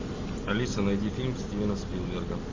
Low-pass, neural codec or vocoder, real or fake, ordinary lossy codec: 7.2 kHz; none; real; MP3, 32 kbps